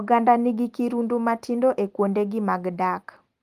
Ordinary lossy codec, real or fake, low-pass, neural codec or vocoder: Opus, 24 kbps; real; 14.4 kHz; none